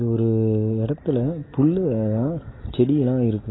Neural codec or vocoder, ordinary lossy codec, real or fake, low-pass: none; AAC, 16 kbps; real; 7.2 kHz